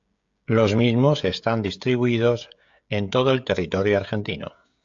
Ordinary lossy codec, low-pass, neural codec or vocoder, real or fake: AAC, 64 kbps; 7.2 kHz; codec, 16 kHz, 16 kbps, FreqCodec, smaller model; fake